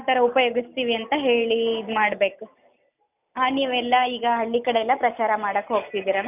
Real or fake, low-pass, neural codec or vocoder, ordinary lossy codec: real; 3.6 kHz; none; none